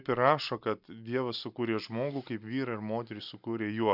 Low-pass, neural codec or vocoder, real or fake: 5.4 kHz; none; real